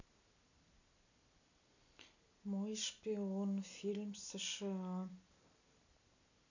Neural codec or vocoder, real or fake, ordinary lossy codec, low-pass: none; real; none; 7.2 kHz